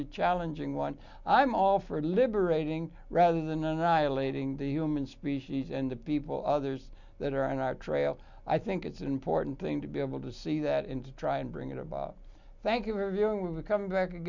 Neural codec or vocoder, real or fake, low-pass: none; real; 7.2 kHz